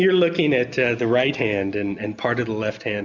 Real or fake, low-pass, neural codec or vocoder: real; 7.2 kHz; none